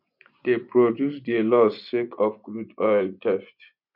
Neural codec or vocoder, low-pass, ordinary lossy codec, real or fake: vocoder, 24 kHz, 100 mel bands, Vocos; 5.4 kHz; none; fake